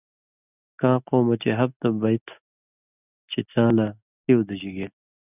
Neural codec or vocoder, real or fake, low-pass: none; real; 3.6 kHz